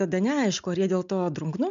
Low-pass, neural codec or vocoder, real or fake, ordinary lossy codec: 7.2 kHz; none; real; MP3, 64 kbps